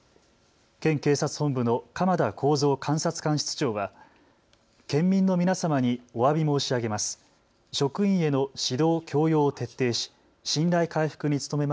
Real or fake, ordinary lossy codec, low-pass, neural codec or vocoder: real; none; none; none